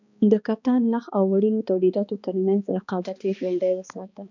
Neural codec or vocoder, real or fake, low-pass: codec, 16 kHz, 2 kbps, X-Codec, HuBERT features, trained on balanced general audio; fake; 7.2 kHz